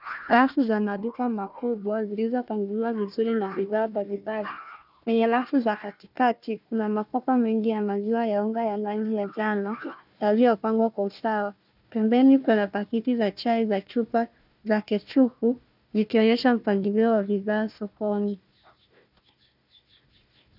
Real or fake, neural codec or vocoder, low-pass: fake; codec, 16 kHz, 1 kbps, FunCodec, trained on Chinese and English, 50 frames a second; 5.4 kHz